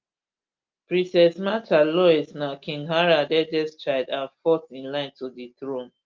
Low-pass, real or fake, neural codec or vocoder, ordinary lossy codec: 7.2 kHz; real; none; Opus, 24 kbps